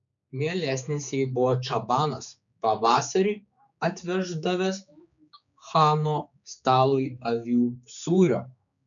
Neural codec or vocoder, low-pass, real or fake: codec, 16 kHz, 4 kbps, X-Codec, HuBERT features, trained on general audio; 7.2 kHz; fake